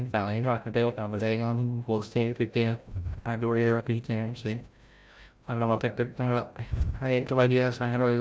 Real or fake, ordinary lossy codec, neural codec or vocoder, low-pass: fake; none; codec, 16 kHz, 0.5 kbps, FreqCodec, larger model; none